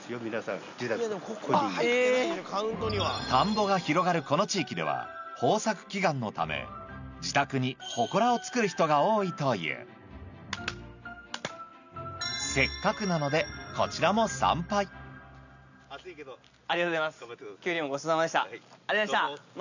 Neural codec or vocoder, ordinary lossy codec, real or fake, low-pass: none; none; real; 7.2 kHz